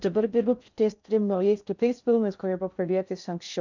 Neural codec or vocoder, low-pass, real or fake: codec, 16 kHz in and 24 kHz out, 0.6 kbps, FocalCodec, streaming, 4096 codes; 7.2 kHz; fake